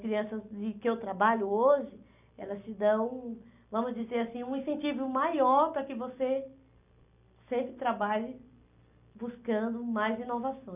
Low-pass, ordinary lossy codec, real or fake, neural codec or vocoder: 3.6 kHz; none; real; none